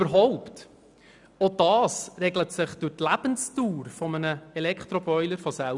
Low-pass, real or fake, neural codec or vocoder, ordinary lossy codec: 10.8 kHz; real; none; none